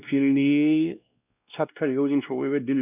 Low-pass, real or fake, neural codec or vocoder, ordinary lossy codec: 3.6 kHz; fake; codec, 16 kHz, 1 kbps, X-Codec, WavLM features, trained on Multilingual LibriSpeech; none